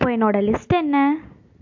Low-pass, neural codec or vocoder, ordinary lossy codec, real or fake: 7.2 kHz; none; MP3, 48 kbps; real